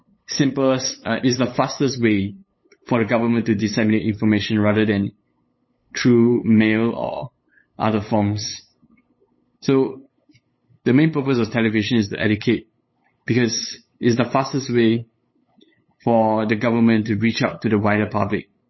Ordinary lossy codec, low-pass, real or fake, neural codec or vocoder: MP3, 24 kbps; 7.2 kHz; fake; codec, 16 kHz, 8 kbps, FunCodec, trained on LibriTTS, 25 frames a second